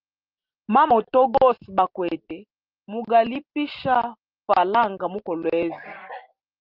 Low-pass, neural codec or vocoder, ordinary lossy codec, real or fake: 5.4 kHz; none; Opus, 24 kbps; real